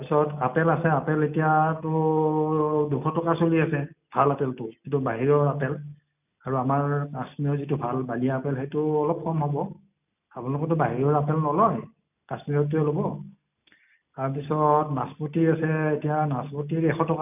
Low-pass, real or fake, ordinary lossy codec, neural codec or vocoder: 3.6 kHz; real; none; none